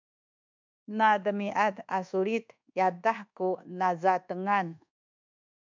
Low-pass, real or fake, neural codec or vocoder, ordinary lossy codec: 7.2 kHz; fake; codec, 24 kHz, 1.2 kbps, DualCodec; MP3, 64 kbps